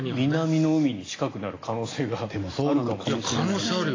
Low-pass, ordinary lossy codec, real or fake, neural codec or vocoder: 7.2 kHz; AAC, 32 kbps; real; none